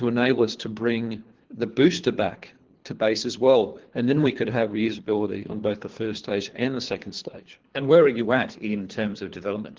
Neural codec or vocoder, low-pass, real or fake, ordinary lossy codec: codec, 24 kHz, 3 kbps, HILCodec; 7.2 kHz; fake; Opus, 16 kbps